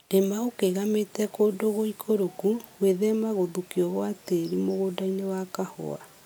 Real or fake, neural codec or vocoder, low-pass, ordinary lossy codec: real; none; none; none